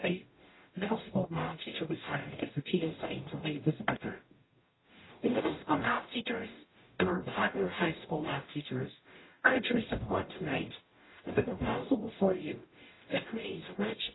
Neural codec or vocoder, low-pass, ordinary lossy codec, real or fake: codec, 44.1 kHz, 0.9 kbps, DAC; 7.2 kHz; AAC, 16 kbps; fake